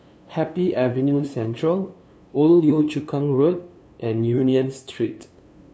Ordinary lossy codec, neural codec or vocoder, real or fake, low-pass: none; codec, 16 kHz, 2 kbps, FunCodec, trained on LibriTTS, 25 frames a second; fake; none